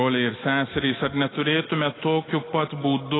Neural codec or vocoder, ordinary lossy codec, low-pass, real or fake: none; AAC, 16 kbps; 7.2 kHz; real